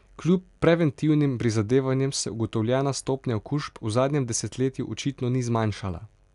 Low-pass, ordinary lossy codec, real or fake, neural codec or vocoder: 10.8 kHz; none; real; none